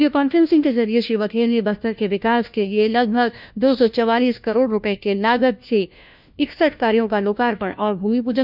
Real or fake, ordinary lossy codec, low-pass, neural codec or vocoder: fake; none; 5.4 kHz; codec, 16 kHz, 1 kbps, FunCodec, trained on LibriTTS, 50 frames a second